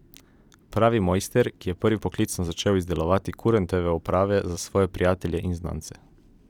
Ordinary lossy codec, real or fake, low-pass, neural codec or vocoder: none; fake; 19.8 kHz; vocoder, 44.1 kHz, 128 mel bands every 256 samples, BigVGAN v2